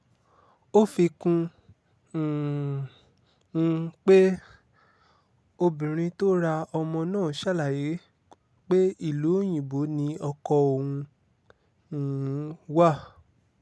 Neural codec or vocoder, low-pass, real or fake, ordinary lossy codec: none; none; real; none